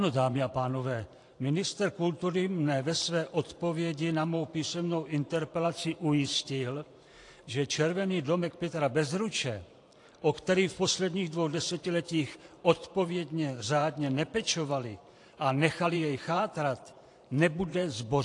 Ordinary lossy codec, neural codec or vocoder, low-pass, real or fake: AAC, 48 kbps; vocoder, 44.1 kHz, 128 mel bands every 512 samples, BigVGAN v2; 10.8 kHz; fake